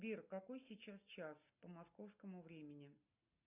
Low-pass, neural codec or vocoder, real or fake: 3.6 kHz; none; real